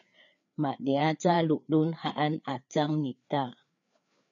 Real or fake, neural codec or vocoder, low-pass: fake; codec, 16 kHz, 4 kbps, FreqCodec, larger model; 7.2 kHz